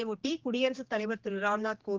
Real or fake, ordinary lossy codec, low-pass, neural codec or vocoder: fake; Opus, 16 kbps; 7.2 kHz; codec, 16 kHz, 2 kbps, X-Codec, HuBERT features, trained on general audio